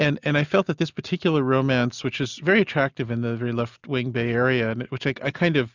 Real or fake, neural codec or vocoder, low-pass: real; none; 7.2 kHz